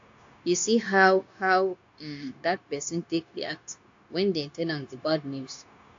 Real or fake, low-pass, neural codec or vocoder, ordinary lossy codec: fake; 7.2 kHz; codec, 16 kHz, 0.9 kbps, LongCat-Audio-Codec; AAC, 48 kbps